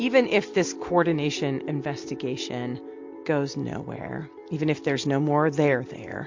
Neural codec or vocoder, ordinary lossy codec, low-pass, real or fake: none; MP3, 48 kbps; 7.2 kHz; real